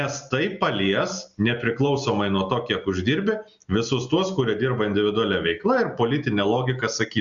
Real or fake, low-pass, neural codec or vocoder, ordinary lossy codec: real; 7.2 kHz; none; Opus, 64 kbps